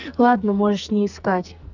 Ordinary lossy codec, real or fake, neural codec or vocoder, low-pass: none; fake; codec, 44.1 kHz, 2.6 kbps, SNAC; 7.2 kHz